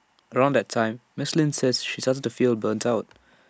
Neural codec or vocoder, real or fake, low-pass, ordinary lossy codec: none; real; none; none